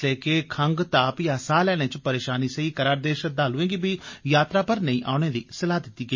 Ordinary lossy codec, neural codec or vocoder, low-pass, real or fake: MP3, 32 kbps; none; 7.2 kHz; real